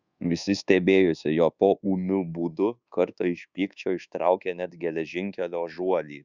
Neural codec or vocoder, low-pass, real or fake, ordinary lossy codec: codec, 24 kHz, 1.2 kbps, DualCodec; 7.2 kHz; fake; Opus, 64 kbps